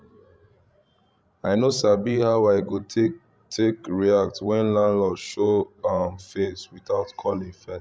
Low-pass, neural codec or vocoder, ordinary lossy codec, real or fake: none; codec, 16 kHz, 16 kbps, FreqCodec, larger model; none; fake